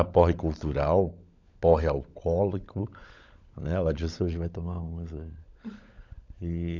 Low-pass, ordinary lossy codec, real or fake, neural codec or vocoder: 7.2 kHz; none; fake; codec, 16 kHz, 16 kbps, FunCodec, trained on LibriTTS, 50 frames a second